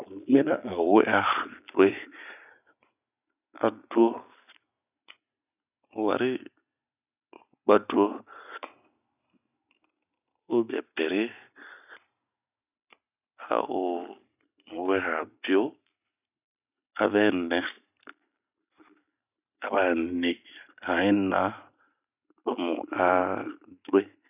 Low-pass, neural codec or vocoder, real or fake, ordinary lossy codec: 3.6 kHz; none; real; none